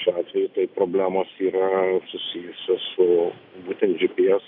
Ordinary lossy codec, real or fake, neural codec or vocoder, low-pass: AAC, 48 kbps; real; none; 5.4 kHz